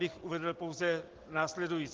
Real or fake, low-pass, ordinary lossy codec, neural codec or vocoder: real; 7.2 kHz; Opus, 16 kbps; none